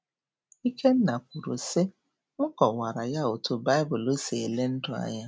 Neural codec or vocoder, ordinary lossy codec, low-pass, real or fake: none; none; none; real